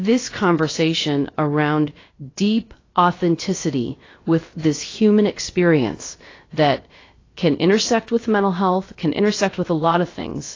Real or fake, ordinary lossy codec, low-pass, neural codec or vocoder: fake; AAC, 32 kbps; 7.2 kHz; codec, 16 kHz, about 1 kbps, DyCAST, with the encoder's durations